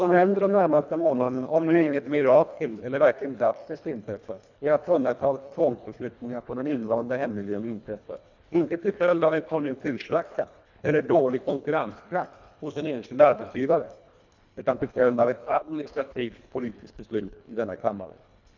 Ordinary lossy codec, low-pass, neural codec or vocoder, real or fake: none; 7.2 kHz; codec, 24 kHz, 1.5 kbps, HILCodec; fake